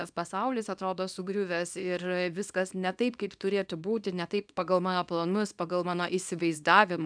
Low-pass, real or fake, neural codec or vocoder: 9.9 kHz; fake; codec, 24 kHz, 0.9 kbps, WavTokenizer, small release